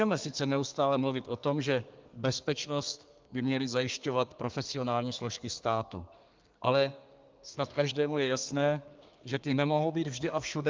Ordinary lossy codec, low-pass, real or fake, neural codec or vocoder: Opus, 24 kbps; 7.2 kHz; fake; codec, 32 kHz, 1.9 kbps, SNAC